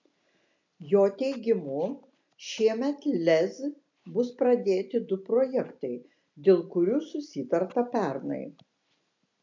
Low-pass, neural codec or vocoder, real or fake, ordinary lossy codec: 7.2 kHz; none; real; MP3, 64 kbps